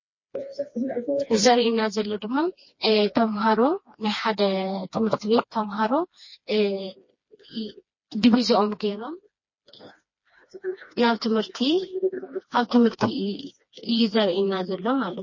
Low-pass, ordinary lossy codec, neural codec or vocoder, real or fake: 7.2 kHz; MP3, 32 kbps; codec, 16 kHz, 2 kbps, FreqCodec, smaller model; fake